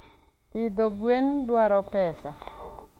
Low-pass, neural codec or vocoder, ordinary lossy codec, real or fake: 19.8 kHz; autoencoder, 48 kHz, 32 numbers a frame, DAC-VAE, trained on Japanese speech; MP3, 64 kbps; fake